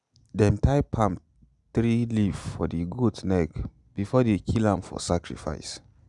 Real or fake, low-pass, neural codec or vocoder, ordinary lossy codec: real; 10.8 kHz; none; none